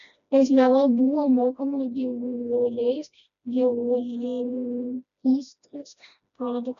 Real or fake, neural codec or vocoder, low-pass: fake; codec, 16 kHz, 1 kbps, FreqCodec, smaller model; 7.2 kHz